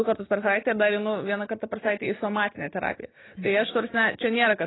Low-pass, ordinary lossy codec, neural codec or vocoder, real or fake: 7.2 kHz; AAC, 16 kbps; none; real